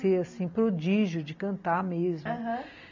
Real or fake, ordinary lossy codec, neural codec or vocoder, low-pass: real; MP3, 64 kbps; none; 7.2 kHz